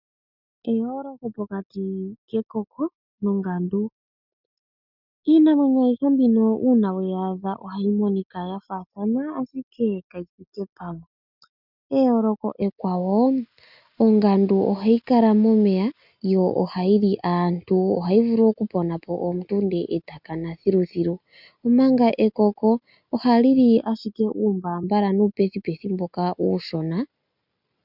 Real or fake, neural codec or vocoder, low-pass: real; none; 5.4 kHz